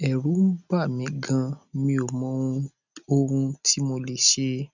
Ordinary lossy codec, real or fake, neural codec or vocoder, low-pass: none; real; none; 7.2 kHz